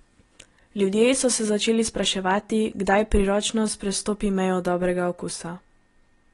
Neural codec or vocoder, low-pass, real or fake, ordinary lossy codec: none; 10.8 kHz; real; AAC, 32 kbps